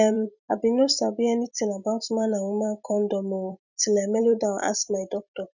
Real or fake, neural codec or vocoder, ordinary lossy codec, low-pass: fake; vocoder, 44.1 kHz, 128 mel bands every 256 samples, BigVGAN v2; none; 7.2 kHz